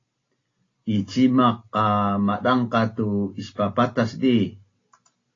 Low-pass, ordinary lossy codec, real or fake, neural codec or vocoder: 7.2 kHz; AAC, 32 kbps; real; none